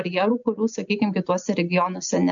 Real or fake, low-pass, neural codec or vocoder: real; 7.2 kHz; none